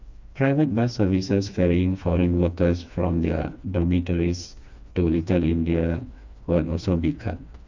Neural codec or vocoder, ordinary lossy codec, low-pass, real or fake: codec, 16 kHz, 2 kbps, FreqCodec, smaller model; none; 7.2 kHz; fake